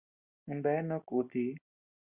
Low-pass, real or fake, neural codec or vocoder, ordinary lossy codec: 3.6 kHz; real; none; Opus, 64 kbps